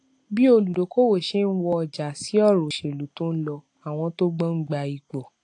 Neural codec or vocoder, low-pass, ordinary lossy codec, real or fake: none; 9.9 kHz; AAC, 48 kbps; real